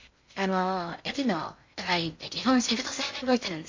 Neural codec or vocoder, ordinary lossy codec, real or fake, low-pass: codec, 16 kHz in and 24 kHz out, 0.6 kbps, FocalCodec, streaming, 2048 codes; MP3, 48 kbps; fake; 7.2 kHz